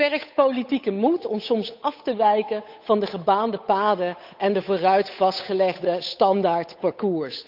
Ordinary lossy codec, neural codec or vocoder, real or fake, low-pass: none; codec, 16 kHz, 8 kbps, FunCodec, trained on Chinese and English, 25 frames a second; fake; 5.4 kHz